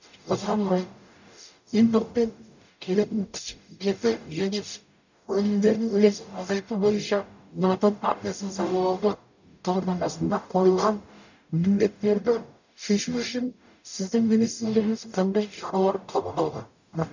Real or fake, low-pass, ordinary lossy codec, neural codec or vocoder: fake; 7.2 kHz; none; codec, 44.1 kHz, 0.9 kbps, DAC